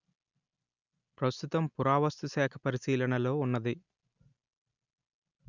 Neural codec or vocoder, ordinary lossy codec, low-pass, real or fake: none; none; 7.2 kHz; real